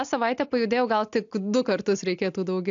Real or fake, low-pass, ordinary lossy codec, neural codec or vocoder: real; 7.2 kHz; AAC, 64 kbps; none